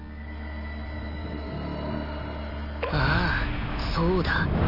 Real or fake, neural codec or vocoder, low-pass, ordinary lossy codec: real; none; 5.4 kHz; none